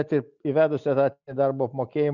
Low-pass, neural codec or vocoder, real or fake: 7.2 kHz; none; real